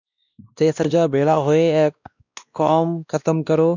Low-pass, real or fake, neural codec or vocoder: 7.2 kHz; fake; codec, 16 kHz, 1 kbps, X-Codec, WavLM features, trained on Multilingual LibriSpeech